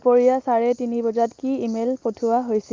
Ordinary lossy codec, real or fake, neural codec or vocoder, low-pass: Opus, 24 kbps; real; none; 7.2 kHz